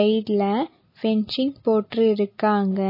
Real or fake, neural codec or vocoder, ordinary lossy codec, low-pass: real; none; MP3, 24 kbps; 5.4 kHz